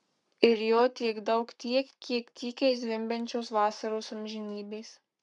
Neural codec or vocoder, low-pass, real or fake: codec, 44.1 kHz, 7.8 kbps, Pupu-Codec; 10.8 kHz; fake